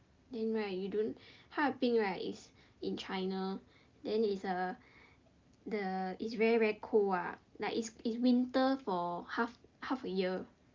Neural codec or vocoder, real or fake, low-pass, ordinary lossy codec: none; real; 7.2 kHz; Opus, 24 kbps